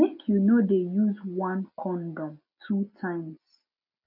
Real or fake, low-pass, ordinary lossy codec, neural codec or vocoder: real; 5.4 kHz; none; none